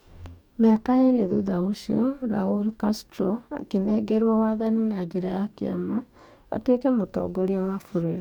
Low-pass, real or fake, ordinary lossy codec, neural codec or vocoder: 19.8 kHz; fake; none; codec, 44.1 kHz, 2.6 kbps, DAC